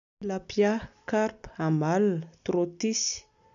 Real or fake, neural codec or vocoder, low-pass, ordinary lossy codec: real; none; 7.2 kHz; none